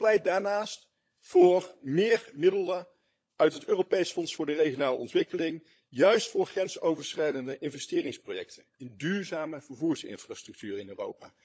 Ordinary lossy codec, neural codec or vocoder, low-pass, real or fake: none; codec, 16 kHz, 16 kbps, FunCodec, trained on LibriTTS, 50 frames a second; none; fake